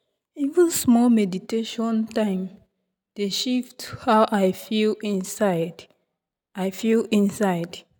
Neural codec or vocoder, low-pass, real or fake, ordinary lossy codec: none; none; real; none